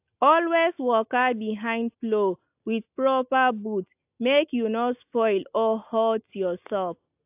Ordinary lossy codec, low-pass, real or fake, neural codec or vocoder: AAC, 32 kbps; 3.6 kHz; real; none